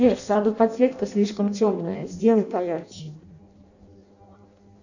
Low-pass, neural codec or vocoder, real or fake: 7.2 kHz; codec, 16 kHz in and 24 kHz out, 0.6 kbps, FireRedTTS-2 codec; fake